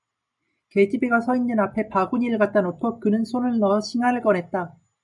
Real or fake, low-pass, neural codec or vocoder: real; 10.8 kHz; none